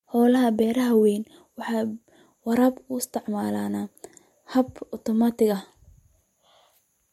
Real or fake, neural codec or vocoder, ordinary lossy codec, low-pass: real; none; MP3, 64 kbps; 19.8 kHz